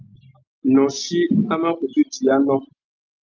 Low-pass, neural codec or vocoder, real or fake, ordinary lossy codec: 7.2 kHz; none; real; Opus, 24 kbps